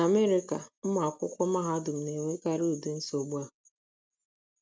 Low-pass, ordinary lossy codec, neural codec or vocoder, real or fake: none; none; none; real